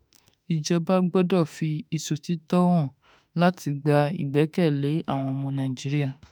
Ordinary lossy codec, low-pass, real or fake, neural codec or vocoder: none; none; fake; autoencoder, 48 kHz, 32 numbers a frame, DAC-VAE, trained on Japanese speech